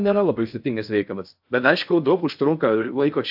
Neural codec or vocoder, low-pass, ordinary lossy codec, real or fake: codec, 16 kHz in and 24 kHz out, 0.6 kbps, FocalCodec, streaming, 4096 codes; 5.4 kHz; AAC, 48 kbps; fake